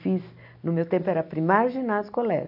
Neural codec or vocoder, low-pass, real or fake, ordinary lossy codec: none; 5.4 kHz; real; AAC, 48 kbps